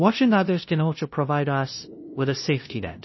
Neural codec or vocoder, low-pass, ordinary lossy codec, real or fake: codec, 16 kHz, 0.5 kbps, FunCodec, trained on LibriTTS, 25 frames a second; 7.2 kHz; MP3, 24 kbps; fake